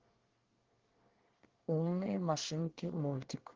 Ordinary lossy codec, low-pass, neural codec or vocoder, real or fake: Opus, 16 kbps; 7.2 kHz; codec, 24 kHz, 1 kbps, SNAC; fake